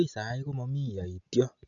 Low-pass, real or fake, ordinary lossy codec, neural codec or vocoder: 7.2 kHz; real; none; none